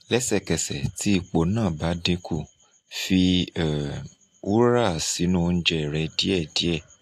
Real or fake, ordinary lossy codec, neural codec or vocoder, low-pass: real; AAC, 64 kbps; none; 14.4 kHz